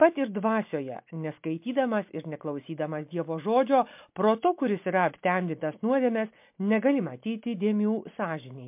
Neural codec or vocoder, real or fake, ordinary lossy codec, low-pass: none; real; MP3, 32 kbps; 3.6 kHz